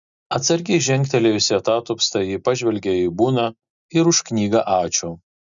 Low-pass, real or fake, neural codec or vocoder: 7.2 kHz; real; none